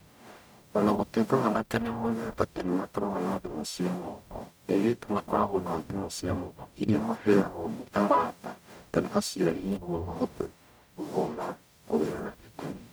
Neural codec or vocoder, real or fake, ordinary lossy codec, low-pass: codec, 44.1 kHz, 0.9 kbps, DAC; fake; none; none